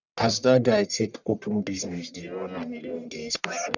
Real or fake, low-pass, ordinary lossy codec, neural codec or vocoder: fake; 7.2 kHz; none; codec, 44.1 kHz, 1.7 kbps, Pupu-Codec